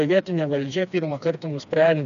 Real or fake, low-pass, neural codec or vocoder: fake; 7.2 kHz; codec, 16 kHz, 2 kbps, FreqCodec, smaller model